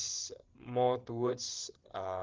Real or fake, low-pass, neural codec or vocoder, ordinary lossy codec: fake; 7.2 kHz; codec, 16 kHz, 8 kbps, FreqCodec, larger model; Opus, 16 kbps